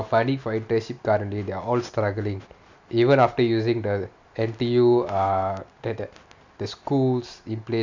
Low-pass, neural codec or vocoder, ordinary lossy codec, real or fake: 7.2 kHz; none; MP3, 64 kbps; real